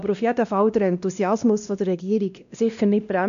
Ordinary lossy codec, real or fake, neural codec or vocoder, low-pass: none; fake; codec, 16 kHz, 1 kbps, X-Codec, WavLM features, trained on Multilingual LibriSpeech; 7.2 kHz